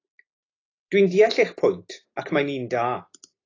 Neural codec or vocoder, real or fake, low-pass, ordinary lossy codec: none; real; 7.2 kHz; AAC, 32 kbps